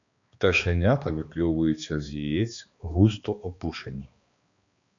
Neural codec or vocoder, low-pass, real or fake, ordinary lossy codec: codec, 16 kHz, 2 kbps, X-Codec, HuBERT features, trained on balanced general audio; 7.2 kHz; fake; MP3, 64 kbps